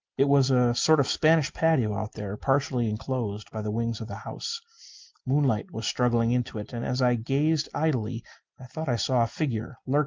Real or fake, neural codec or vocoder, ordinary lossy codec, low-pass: real; none; Opus, 32 kbps; 7.2 kHz